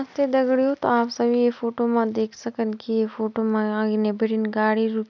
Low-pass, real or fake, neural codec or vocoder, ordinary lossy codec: 7.2 kHz; real; none; none